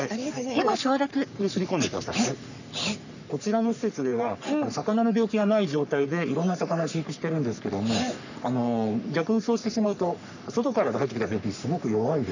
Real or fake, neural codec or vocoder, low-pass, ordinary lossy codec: fake; codec, 44.1 kHz, 3.4 kbps, Pupu-Codec; 7.2 kHz; none